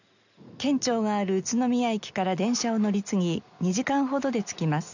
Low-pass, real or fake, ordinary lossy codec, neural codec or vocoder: 7.2 kHz; real; none; none